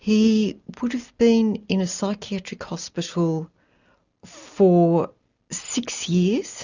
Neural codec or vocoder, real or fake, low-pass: none; real; 7.2 kHz